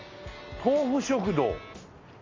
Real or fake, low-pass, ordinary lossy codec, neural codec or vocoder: real; 7.2 kHz; AAC, 32 kbps; none